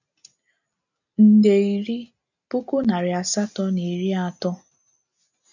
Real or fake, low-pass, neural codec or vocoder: real; 7.2 kHz; none